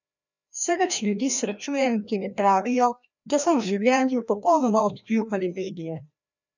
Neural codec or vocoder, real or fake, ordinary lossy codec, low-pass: codec, 16 kHz, 1 kbps, FreqCodec, larger model; fake; none; 7.2 kHz